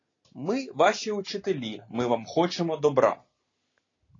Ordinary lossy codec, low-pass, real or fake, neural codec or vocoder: AAC, 32 kbps; 7.2 kHz; real; none